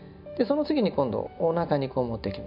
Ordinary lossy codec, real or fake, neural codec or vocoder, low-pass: none; real; none; 5.4 kHz